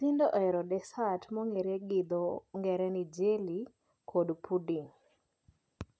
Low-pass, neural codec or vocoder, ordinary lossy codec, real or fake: none; none; none; real